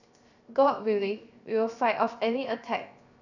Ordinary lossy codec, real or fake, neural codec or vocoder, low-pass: none; fake; codec, 16 kHz, 0.7 kbps, FocalCodec; 7.2 kHz